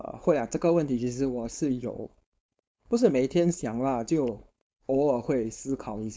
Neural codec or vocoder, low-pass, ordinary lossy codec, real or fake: codec, 16 kHz, 4.8 kbps, FACodec; none; none; fake